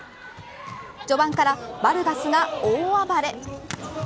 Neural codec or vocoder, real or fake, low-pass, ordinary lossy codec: none; real; none; none